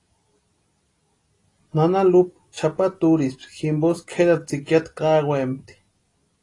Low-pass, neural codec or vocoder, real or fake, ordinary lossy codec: 10.8 kHz; none; real; AAC, 32 kbps